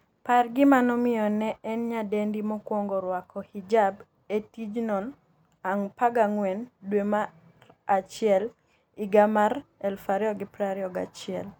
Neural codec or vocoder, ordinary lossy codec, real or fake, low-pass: none; none; real; none